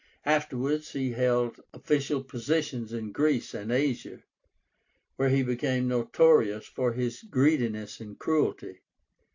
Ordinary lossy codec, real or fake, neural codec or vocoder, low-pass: AAC, 48 kbps; real; none; 7.2 kHz